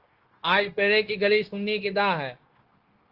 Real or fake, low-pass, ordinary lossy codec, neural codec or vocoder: fake; 5.4 kHz; Opus, 16 kbps; codec, 16 kHz, 0.9 kbps, LongCat-Audio-Codec